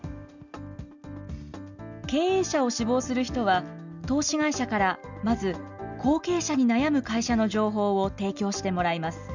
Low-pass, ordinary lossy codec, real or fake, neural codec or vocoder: 7.2 kHz; none; real; none